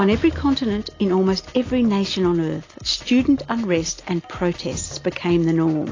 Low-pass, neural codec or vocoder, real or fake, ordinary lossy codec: 7.2 kHz; none; real; AAC, 32 kbps